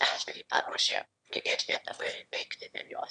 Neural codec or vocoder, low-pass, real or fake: autoencoder, 22.05 kHz, a latent of 192 numbers a frame, VITS, trained on one speaker; 9.9 kHz; fake